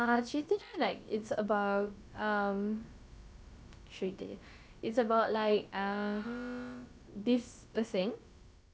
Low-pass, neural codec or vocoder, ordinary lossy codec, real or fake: none; codec, 16 kHz, about 1 kbps, DyCAST, with the encoder's durations; none; fake